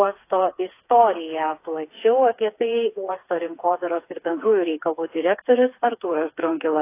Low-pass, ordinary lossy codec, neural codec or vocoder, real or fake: 3.6 kHz; AAC, 24 kbps; codec, 16 kHz, 4 kbps, FreqCodec, smaller model; fake